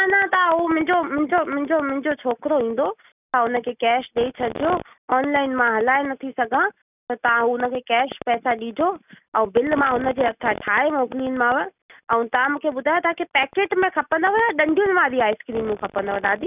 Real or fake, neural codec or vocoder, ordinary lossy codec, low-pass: real; none; none; 3.6 kHz